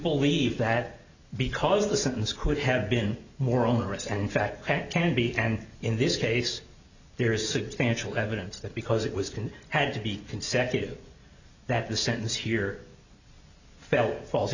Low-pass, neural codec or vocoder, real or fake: 7.2 kHz; none; real